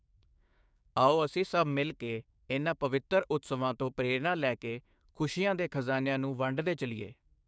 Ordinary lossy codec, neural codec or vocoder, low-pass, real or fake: none; codec, 16 kHz, 6 kbps, DAC; none; fake